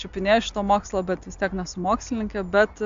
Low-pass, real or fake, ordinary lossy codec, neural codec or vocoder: 7.2 kHz; real; AAC, 64 kbps; none